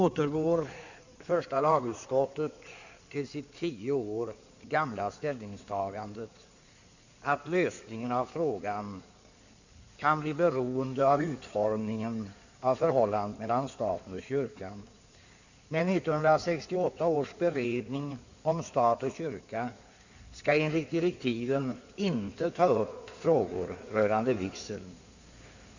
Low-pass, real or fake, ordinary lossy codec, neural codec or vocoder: 7.2 kHz; fake; none; codec, 16 kHz in and 24 kHz out, 2.2 kbps, FireRedTTS-2 codec